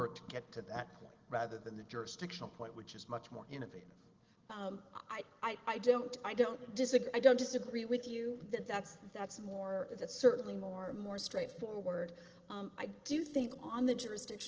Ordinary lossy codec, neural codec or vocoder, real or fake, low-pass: Opus, 16 kbps; none; real; 7.2 kHz